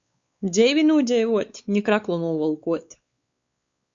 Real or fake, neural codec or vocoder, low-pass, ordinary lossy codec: fake; codec, 16 kHz, 4 kbps, X-Codec, WavLM features, trained on Multilingual LibriSpeech; 7.2 kHz; Opus, 64 kbps